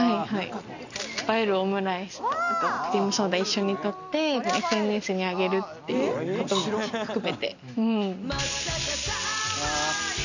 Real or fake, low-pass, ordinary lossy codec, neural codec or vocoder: real; 7.2 kHz; none; none